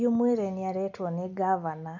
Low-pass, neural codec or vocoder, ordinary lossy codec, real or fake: 7.2 kHz; none; none; real